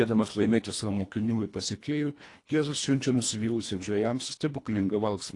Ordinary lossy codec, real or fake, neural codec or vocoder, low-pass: AAC, 48 kbps; fake; codec, 24 kHz, 1.5 kbps, HILCodec; 10.8 kHz